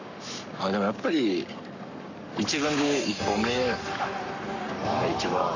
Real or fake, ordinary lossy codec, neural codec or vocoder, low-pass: fake; none; codec, 44.1 kHz, 7.8 kbps, Pupu-Codec; 7.2 kHz